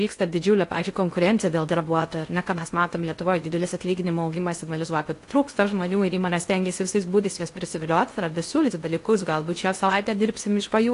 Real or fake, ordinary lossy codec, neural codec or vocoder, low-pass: fake; AAC, 48 kbps; codec, 16 kHz in and 24 kHz out, 0.6 kbps, FocalCodec, streaming, 2048 codes; 10.8 kHz